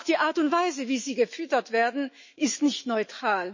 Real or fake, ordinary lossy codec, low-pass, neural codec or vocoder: fake; MP3, 32 kbps; 7.2 kHz; autoencoder, 48 kHz, 128 numbers a frame, DAC-VAE, trained on Japanese speech